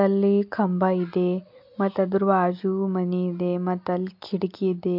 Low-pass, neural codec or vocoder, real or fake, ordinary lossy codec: 5.4 kHz; none; real; MP3, 48 kbps